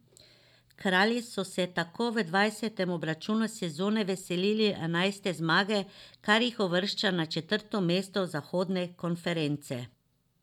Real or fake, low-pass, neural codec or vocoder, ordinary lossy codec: real; 19.8 kHz; none; none